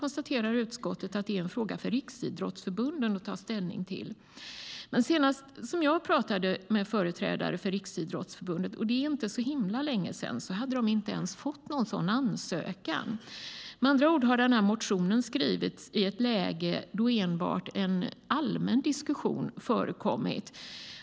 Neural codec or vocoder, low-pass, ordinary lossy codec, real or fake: none; none; none; real